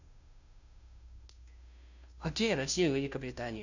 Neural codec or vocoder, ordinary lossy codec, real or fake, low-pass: codec, 16 kHz, 0.5 kbps, FunCodec, trained on Chinese and English, 25 frames a second; none; fake; 7.2 kHz